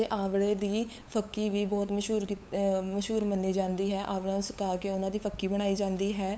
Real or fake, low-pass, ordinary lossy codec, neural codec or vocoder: fake; none; none; codec, 16 kHz, 8 kbps, FunCodec, trained on LibriTTS, 25 frames a second